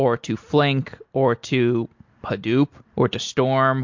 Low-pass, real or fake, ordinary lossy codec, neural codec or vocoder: 7.2 kHz; real; MP3, 48 kbps; none